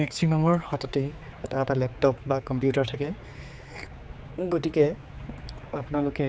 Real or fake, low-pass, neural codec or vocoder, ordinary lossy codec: fake; none; codec, 16 kHz, 4 kbps, X-Codec, HuBERT features, trained on general audio; none